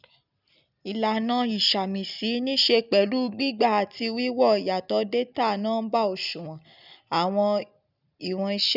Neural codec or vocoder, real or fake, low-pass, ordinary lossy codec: none; real; 5.4 kHz; none